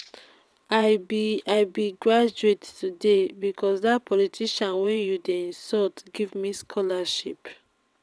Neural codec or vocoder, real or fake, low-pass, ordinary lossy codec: vocoder, 22.05 kHz, 80 mel bands, WaveNeXt; fake; none; none